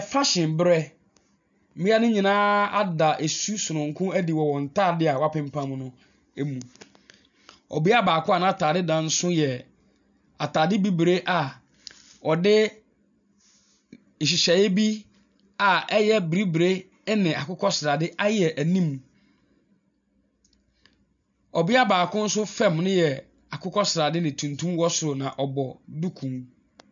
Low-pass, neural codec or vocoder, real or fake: 7.2 kHz; none; real